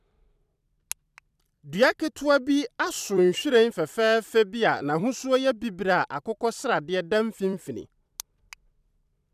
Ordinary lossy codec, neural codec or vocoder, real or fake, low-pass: none; vocoder, 44.1 kHz, 128 mel bands every 256 samples, BigVGAN v2; fake; 14.4 kHz